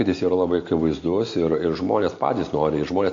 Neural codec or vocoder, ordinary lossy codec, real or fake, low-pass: none; AAC, 48 kbps; real; 7.2 kHz